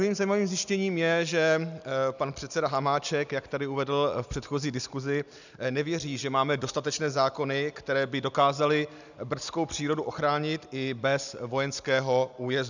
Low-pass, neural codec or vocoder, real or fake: 7.2 kHz; none; real